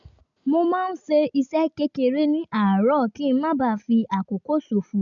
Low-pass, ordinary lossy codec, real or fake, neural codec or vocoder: 7.2 kHz; none; real; none